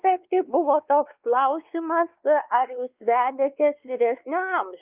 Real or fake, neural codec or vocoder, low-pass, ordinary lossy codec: fake; codec, 16 kHz, 2 kbps, X-Codec, WavLM features, trained on Multilingual LibriSpeech; 3.6 kHz; Opus, 32 kbps